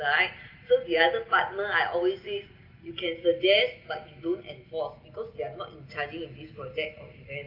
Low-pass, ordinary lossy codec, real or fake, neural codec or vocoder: 5.4 kHz; Opus, 32 kbps; real; none